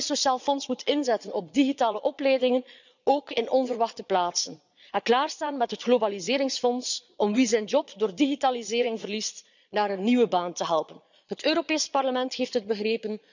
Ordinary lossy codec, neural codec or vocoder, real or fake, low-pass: none; vocoder, 22.05 kHz, 80 mel bands, Vocos; fake; 7.2 kHz